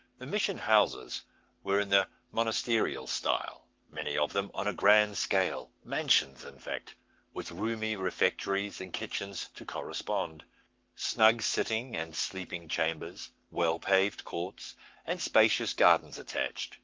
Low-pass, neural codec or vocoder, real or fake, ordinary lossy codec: 7.2 kHz; codec, 44.1 kHz, 7.8 kbps, Pupu-Codec; fake; Opus, 24 kbps